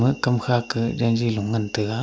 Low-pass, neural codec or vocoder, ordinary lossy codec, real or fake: none; none; none; real